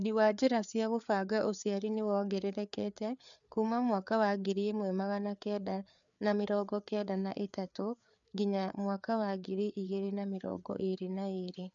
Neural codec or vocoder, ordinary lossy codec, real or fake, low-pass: codec, 16 kHz, 4 kbps, FreqCodec, larger model; none; fake; 7.2 kHz